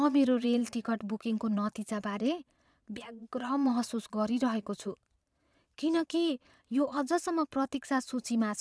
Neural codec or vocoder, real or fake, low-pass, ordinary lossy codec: vocoder, 22.05 kHz, 80 mel bands, Vocos; fake; none; none